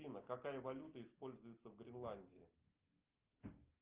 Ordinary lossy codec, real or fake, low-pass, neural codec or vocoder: Opus, 32 kbps; real; 3.6 kHz; none